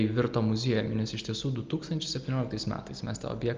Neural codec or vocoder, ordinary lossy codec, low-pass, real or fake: none; Opus, 24 kbps; 7.2 kHz; real